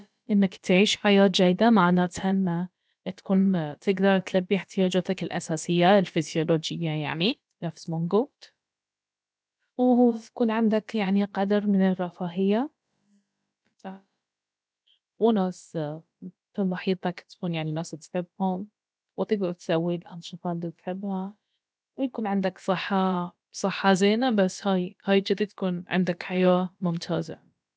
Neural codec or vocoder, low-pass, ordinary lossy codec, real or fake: codec, 16 kHz, about 1 kbps, DyCAST, with the encoder's durations; none; none; fake